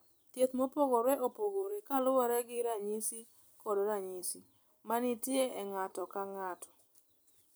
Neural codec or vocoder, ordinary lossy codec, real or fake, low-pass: vocoder, 44.1 kHz, 128 mel bands every 256 samples, BigVGAN v2; none; fake; none